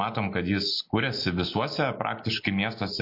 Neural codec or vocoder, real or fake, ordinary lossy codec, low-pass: none; real; MP3, 32 kbps; 5.4 kHz